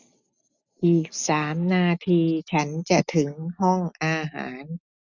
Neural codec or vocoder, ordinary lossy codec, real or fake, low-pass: none; none; real; 7.2 kHz